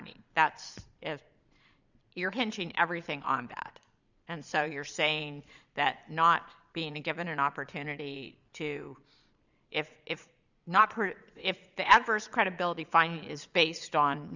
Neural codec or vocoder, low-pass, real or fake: vocoder, 22.05 kHz, 80 mel bands, Vocos; 7.2 kHz; fake